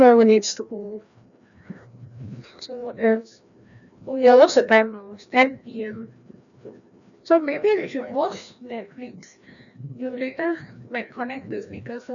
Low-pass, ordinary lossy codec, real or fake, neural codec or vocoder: 7.2 kHz; none; fake; codec, 16 kHz, 1 kbps, FreqCodec, larger model